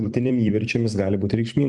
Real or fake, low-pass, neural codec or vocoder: fake; 10.8 kHz; vocoder, 44.1 kHz, 128 mel bands every 256 samples, BigVGAN v2